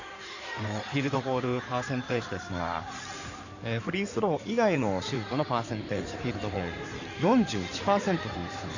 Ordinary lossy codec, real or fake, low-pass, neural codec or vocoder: none; fake; 7.2 kHz; codec, 16 kHz in and 24 kHz out, 2.2 kbps, FireRedTTS-2 codec